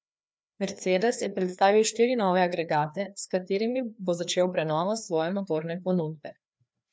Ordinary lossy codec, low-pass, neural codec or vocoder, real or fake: none; none; codec, 16 kHz, 2 kbps, FreqCodec, larger model; fake